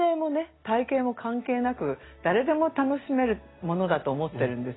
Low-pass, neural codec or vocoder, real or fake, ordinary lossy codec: 7.2 kHz; none; real; AAC, 16 kbps